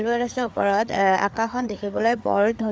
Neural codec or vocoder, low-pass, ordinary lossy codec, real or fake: codec, 16 kHz, 4 kbps, FreqCodec, larger model; none; none; fake